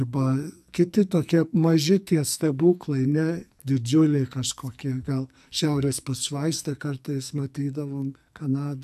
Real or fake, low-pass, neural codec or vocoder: fake; 14.4 kHz; codec, 44.1 kHz, 2.6 kbps, SNAC